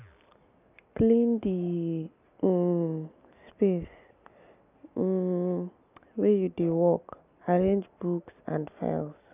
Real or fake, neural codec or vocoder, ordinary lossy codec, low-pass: real; none; none; 3.6 kHz